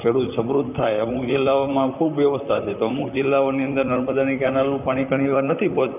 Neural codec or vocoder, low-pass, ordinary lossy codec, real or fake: vocoder, 44.1 kHz, 128 mel bands, Pupu-Vocoder; 3.6 kHz; none; fake